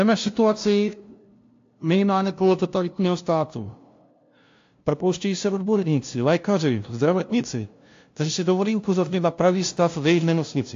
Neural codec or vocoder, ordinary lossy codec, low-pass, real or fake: codec, 16 kHz, 0.5 kbps, FunCodec, trained on LibriTTS, 25 frames a second; AAC, 48 kbps; 7.2 kHz; fake